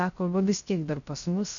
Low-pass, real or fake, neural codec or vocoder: 7.2 kHz; fake; codec, 16 kHz, 0.3 kbps, FocalCodec